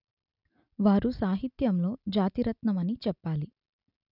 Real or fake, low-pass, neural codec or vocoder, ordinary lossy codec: real; 5.4 kHz; none; none